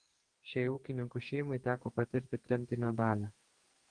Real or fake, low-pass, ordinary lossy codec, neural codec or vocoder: fake; 9.9 kHz; Opus, 24 kbps; codec, 44.1 kHz, 2.6 kbps, SNAC